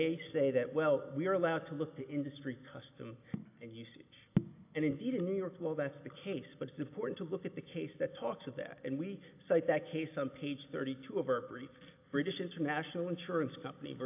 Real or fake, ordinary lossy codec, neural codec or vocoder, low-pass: real; AAC, 32 kbps; none; 3.6 kHz